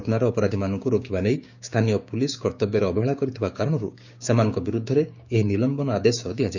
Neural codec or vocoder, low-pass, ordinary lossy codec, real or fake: codec, 16 kHz, 6 kbps, DAC; 7.2 kHz; none; fake